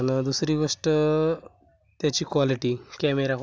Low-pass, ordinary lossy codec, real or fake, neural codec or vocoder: none; none; real; none